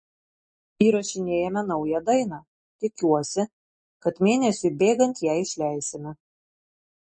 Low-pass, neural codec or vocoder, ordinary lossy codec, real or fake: 9.9 kHz; none; MP3, 32 kbps; real